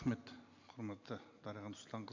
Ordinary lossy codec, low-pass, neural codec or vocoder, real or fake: MP3, 64 kbps; 7.2 kHz; none; real